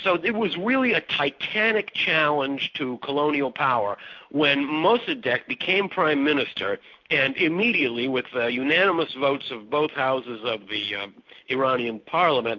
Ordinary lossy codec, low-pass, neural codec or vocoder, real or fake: MP3, 48 kbps; 7.2 kHz; none; real